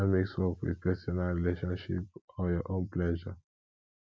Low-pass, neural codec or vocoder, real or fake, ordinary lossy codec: none; none; real; none